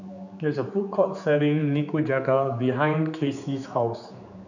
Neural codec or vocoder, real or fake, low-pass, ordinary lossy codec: codec, 16 kHz, 4 kbps, X-Codec, HuBERT features, trained on general audio; fake; 7.2 kHz; none